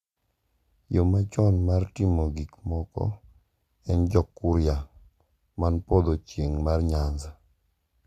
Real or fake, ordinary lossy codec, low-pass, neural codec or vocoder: real; Opus, 64 kbps; 14.4 kHz; none